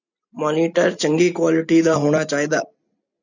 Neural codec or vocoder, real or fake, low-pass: none; real; 7.2 kHz